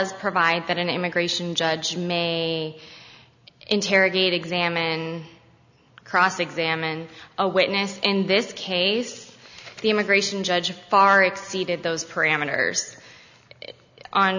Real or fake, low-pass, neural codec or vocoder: real; 7.2 kHz; none